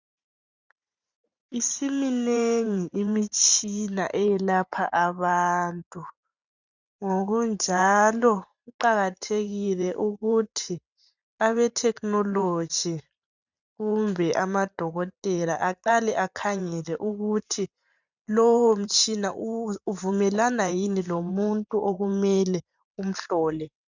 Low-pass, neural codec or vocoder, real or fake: 7.2 kHz; vocoder, 44.1 kHz, 128 mel bands every 512 samples, BigVGAN v2; fake